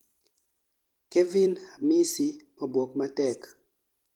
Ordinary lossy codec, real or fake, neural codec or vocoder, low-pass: Opus, 24 kbps; fake; vocoder, 44.1 kHz, 128 mel bands every 256 samples, BigVGAN v2; 19.8 kHz